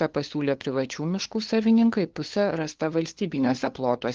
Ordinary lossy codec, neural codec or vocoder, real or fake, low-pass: Opus, 16 kbps; codec, 16 kHz, 2 kbps, FunCodec, trained on LibriTTS, 25 frames a second; fake; 7.2 kHz